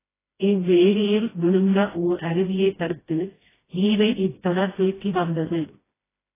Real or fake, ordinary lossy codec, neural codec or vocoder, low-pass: fake; AAC, 16 kbps; codec, 16 kHz, 1 kbps, FreqCodec, smaller model; 3.6 kHz